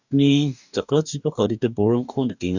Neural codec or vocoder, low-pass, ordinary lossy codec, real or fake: codec, 44.1 kHz, 2.6 kbps, DAC; 7.2 kHz; none; fake